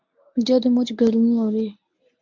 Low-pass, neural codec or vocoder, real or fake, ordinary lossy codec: 7.2 kHz; codec, 24 kHz, 0.9 kbps, WavTokenizer, medium speech release version 1; fake; MP3, 64 kbps